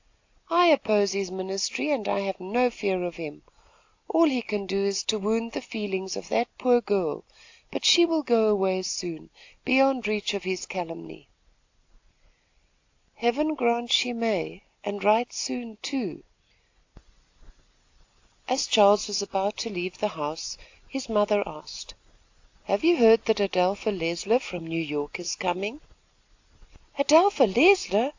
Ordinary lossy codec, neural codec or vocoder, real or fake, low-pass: AAC, 48 kbps; none; real; 7.2 kHz